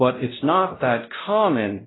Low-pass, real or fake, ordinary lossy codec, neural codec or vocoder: 7.2 kHz; fake; AAC, 16 kbps; codec, 16 kHz, 0.5 kbps, X-Codec, WavLM features, trained on Multilingual LibriSpeech